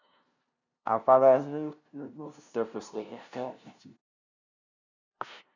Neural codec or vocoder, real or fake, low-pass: codec, 16 kHz, 0.5 kbps, FunCodec, trained on LibriTTS, 25 frames a second; fake; 7.2 kHz